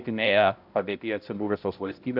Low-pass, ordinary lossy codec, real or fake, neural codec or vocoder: 5.4 kHz; AAC, 48 kbps; fake; codec, 16 kHz, 0.5 kbps, X-Codec, HuBERT features, trained on balanced general audio